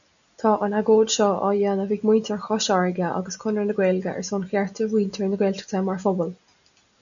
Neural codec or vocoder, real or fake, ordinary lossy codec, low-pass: none; real; MP3, 96 kbps; 7.2 kHz